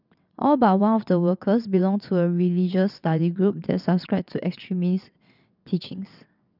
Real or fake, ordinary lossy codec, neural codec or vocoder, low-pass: fake; none; vocoder, 22.05 kHz, 80 mel bands, WaveNeXt; 5.4 kHz